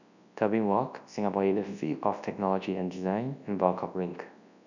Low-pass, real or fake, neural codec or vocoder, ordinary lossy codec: 7.2 kHz; fake; codec, 24 kHz, 0.9 kbps, WavTokenizer, large speech release; none